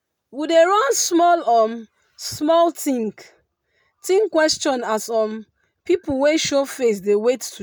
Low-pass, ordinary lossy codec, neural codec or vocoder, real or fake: none; none; none; real